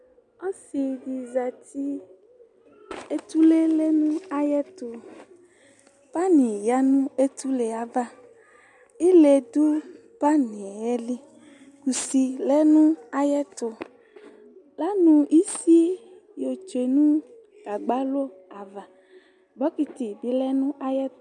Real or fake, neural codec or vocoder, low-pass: real; none; 10.8 kHz